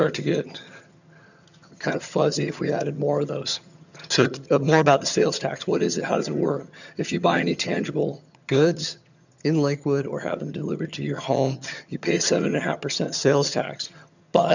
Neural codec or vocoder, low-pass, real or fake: vocoder, 22.05 kHz, 80 mel bands, HiFi-GAN; 7.2 kHz; fake